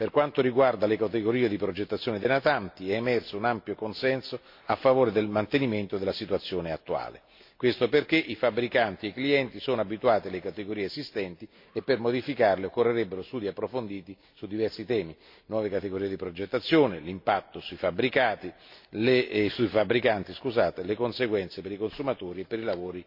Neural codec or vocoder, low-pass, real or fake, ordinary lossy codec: none; 5.4 kHz; real; MP3, 32 kbps